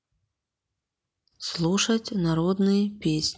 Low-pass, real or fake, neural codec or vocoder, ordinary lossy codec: none; real; none; none